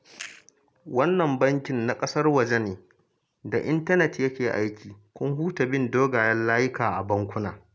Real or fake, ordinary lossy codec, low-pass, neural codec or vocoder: real; none; none; none